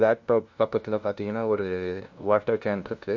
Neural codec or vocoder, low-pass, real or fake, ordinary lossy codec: codec, 16 kHz, 0.5 kbps, FunCodec, trained on LibriTTS, 25 frames a second; 7.2 kHz; fake; MP3, 48 kbps